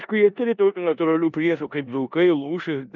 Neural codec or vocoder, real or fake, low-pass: codec, 16 kHz in and 24 kHz out, 0.9 kbps, LongCat-Audio-Codec, four codebook decoder; fake; 7.2 kHz